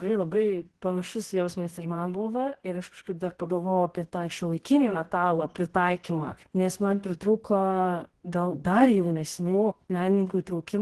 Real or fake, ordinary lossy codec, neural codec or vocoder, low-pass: fake; Opus, 16 kbps; codec, 24 kHz, 0.9 kbps, WavTokenizer, medium music audio release; 10.8 kHz